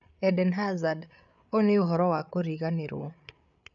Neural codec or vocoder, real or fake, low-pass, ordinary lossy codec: codec, 16 kHz, 8 kbps, FreqCodec, larger model; fake; 7.2 kHz; none